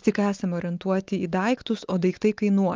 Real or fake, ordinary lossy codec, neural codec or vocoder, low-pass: real; Opus, 24 kbps; none; 7.2 kHz